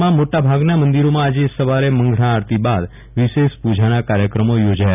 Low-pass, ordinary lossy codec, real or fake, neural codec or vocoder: 3.6 kHz; none; real; none